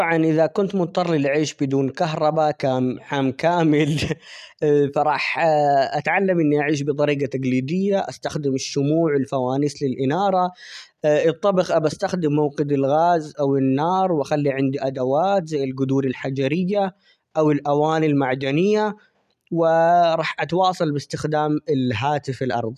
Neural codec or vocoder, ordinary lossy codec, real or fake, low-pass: none; none; real; 14.4 kHz